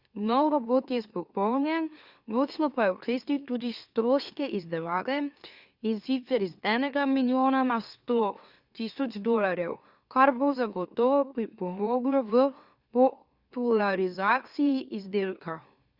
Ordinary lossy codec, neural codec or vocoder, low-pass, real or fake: Opus, 64 kbps; autoencoder, 44.1 kHz, a latent of 192 numbers a frame, MeloTTS; 5.4 kHz; fake